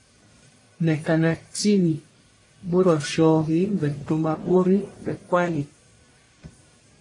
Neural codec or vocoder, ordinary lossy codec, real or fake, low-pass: codec, 44.1 kHz, 1.7 kbps, Pupu-Codec; AAC, 32 kbps; fake; 10.8 kHz